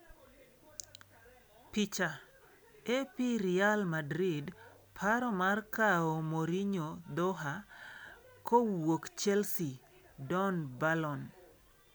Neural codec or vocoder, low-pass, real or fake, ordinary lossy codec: none; none; real; none